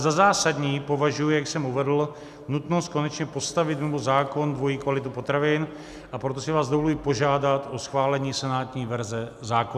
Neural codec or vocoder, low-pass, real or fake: none; 14.4 kHz; real